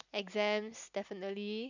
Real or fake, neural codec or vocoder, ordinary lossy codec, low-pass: real; none; none; 7.2 kHz